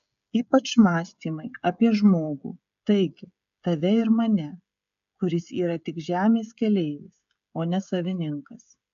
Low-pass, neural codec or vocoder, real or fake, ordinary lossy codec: 7.2 kHz; codec, 16 kHz, 16 kbps, FreqCodec, smaller model; fake; AAC, 96 kbps